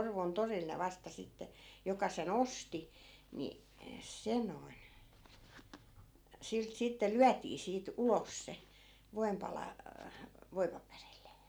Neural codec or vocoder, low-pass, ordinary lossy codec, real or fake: none; none; none; real